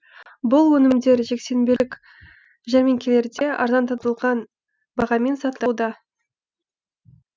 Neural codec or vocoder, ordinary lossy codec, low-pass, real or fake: none; none; none; real